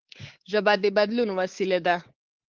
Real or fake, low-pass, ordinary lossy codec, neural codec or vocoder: fake; 7.2 kHz; Opus, 16 kbps; codec, 24 kHz, 3.1 kbps, DualCodec